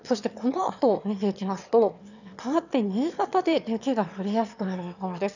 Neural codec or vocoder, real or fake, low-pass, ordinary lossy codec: autoencoder, 22.05 kHz, a latent of 192 numbers a frame, VITS, trained on one speaker; fake; 7.2 kHz; none